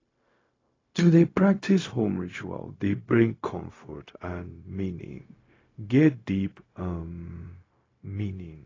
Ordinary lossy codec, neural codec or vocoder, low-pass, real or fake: AAC, 32 kbps; codec, 16 kHz, 0.4 kbps, LongCat-Audio-Codec; 7.2 kHz; fake